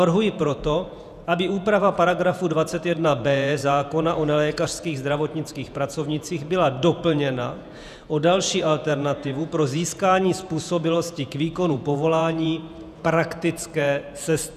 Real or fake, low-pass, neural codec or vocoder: fake; 14.4 kHz; vocoder, 48 kHz, 128 mel bands, Vocos